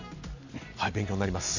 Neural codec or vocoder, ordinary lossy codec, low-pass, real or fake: none; none; 7.2 kHz; real